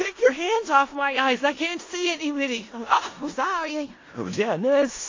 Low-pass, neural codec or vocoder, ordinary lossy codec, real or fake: 7.2 kHz; codec, 16 kHz in and 24 kHz out, 0.4 kbps, LongCat-Audio-Codec, four codebook decoder; AAC, 32 kbps; fake